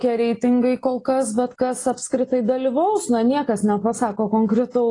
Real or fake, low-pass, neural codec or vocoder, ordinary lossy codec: real; 10.8 kHz; none; AAC, 32 kbps